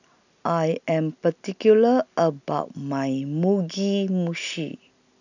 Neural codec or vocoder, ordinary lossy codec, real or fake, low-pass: none; none; real; 7.2 kHz